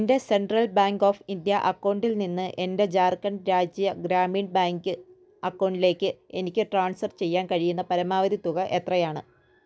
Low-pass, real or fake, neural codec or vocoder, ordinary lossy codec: none; real; none; none